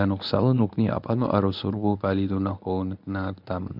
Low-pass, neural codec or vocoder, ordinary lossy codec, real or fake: 5.4 kHz; codec, 24 kHz, 0.9 kbps, WavTokenizer, medium speech release version 1; none; fake